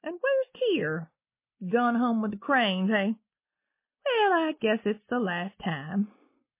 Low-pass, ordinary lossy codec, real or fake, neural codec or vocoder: 3.6 kHz; MP3, 24 kbps; real; none